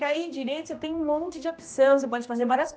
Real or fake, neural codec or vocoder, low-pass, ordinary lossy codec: fake; codec, 16 kHz, 1 kbps, X-Codec, HuBERT features, trained on general audio; none; none